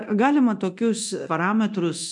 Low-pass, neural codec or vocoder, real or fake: 10.8 kHz; codec, 24 kHz, 0.9 kbps, DualCodec; fake